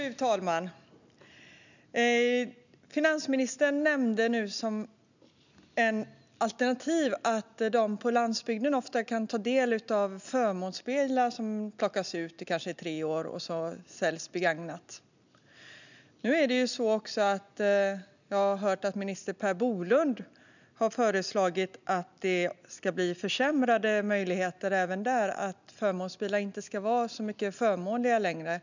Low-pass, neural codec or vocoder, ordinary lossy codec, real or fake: 7.2 kHz; none; none; real